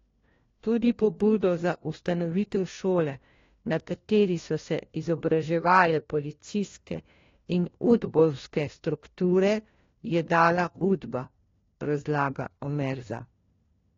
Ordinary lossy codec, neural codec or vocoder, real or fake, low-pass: AAC, 32 kbps; codec, 16 kHz, 1 kbps, FunCodec, trained on LibriTTS, 50 frames a second; fake; 7.2 kHz